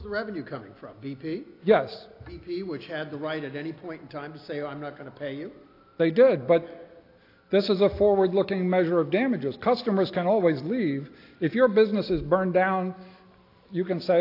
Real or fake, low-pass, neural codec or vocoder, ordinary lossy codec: real; 5.4 kHz; none; AAC, 32 kbps